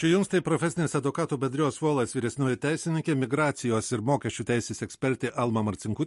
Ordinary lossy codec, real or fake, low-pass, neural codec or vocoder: MP3, 48 kbps; real; 14.4 kHz; none